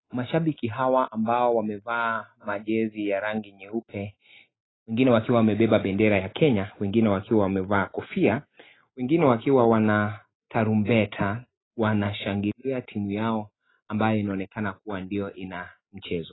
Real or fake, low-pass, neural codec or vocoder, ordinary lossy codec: real; 7.2 kHz; none; AAC, 16 kbps